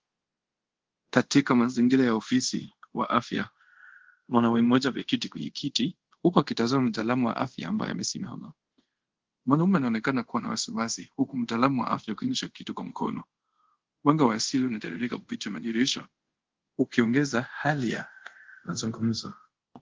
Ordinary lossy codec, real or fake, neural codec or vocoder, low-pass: Opus, 16 kbps; fake; codec, 24 kHz, 0.5 kbps, DualCodec; 7.2 kHz